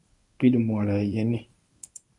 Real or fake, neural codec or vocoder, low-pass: fake; codec, 24 kHz, 0.9 kbps, WavTokenizer, medium speech release version 1; 10.8 kHz